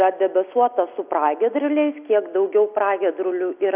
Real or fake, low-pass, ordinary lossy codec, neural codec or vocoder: real; 3.6 kHz; AAC, 32 kbps; none